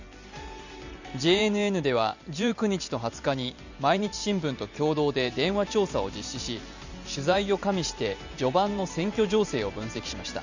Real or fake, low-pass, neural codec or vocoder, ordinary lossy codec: fake; 7.2 kHz; vocoder, 44.1 kHz, 128 mel bands every 512 samples, BigVGAN v2; none